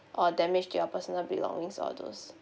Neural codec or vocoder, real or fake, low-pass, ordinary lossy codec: none; real; none; none